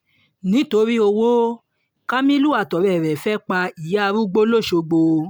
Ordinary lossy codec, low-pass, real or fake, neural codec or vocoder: none; none; real; none